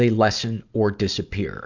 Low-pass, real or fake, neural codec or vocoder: 7.2 kHz; real; none